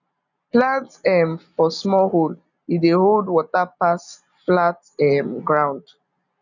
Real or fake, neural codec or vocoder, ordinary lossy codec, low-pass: real; none; none; 7.2 kHz